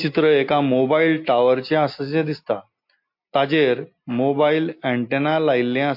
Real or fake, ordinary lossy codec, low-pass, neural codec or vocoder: real; MP3, 32 kbps; 5.4 kHz; none